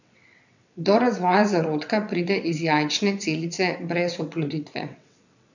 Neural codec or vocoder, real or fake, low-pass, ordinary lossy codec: vocoder, 22.05 kHz, 80 mel bands, WaveNeXt; fake; 7.2 kHz; none